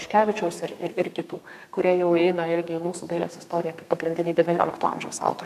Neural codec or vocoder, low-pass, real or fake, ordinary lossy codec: codec, 32 kHz, 1.9 kbps, SNAC; 14.4 kHz; fake; AAC, 96 kbps